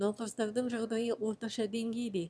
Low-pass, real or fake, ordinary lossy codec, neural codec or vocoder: none; fake; none; autoencoder, 22.05 kHz, a latent of 192 numbers a frame, VITS, trained on one speaker